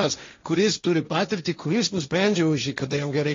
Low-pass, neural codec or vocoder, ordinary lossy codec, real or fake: 7.2 kHz; codec, 16 kHz, 1.1 kbps, Voila-Tokenizer; AAC, 32 kbps; fake